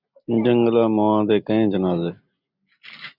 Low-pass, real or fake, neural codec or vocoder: 5.4 kHz; real; none